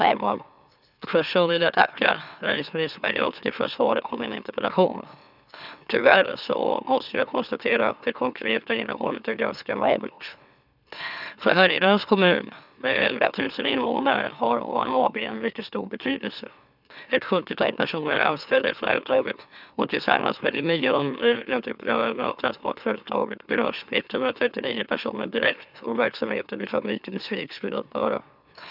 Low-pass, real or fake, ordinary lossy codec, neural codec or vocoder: 5.4 kHz; fake; none; autoencoder, 44.1 kHz, a latent of 192 numbers a frame, MeloTTS